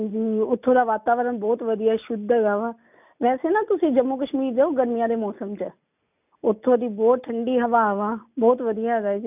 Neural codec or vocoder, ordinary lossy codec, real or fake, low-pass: none; none; real; 3.6 kHz